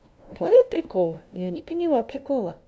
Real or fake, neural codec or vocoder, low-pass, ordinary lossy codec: fake; codec, 16 kHz, 0.5 kbps, FunCodec, trained on LibriTTS, 25 frames a second; none; none